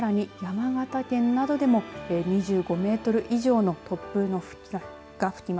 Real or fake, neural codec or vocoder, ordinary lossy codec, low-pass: real; none; none; none